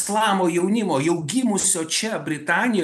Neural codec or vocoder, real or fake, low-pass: none; real; 14.4 kHz